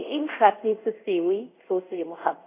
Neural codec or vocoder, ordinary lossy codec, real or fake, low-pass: codec, 24 kHz, 0.5 kbps, DualCodec; AAC, 24 kbps; fake; 3.6 kHz